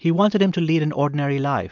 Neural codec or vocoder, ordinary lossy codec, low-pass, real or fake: none; MP3, 64 kbps; 7.2 kHz; real